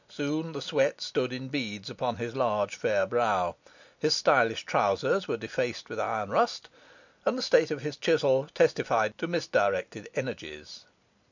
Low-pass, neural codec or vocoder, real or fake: 7.2 kHz; none; real